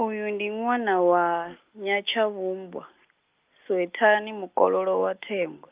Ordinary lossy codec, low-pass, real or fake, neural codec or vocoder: Opus, 32 kbps; 3.6 kHz; real; none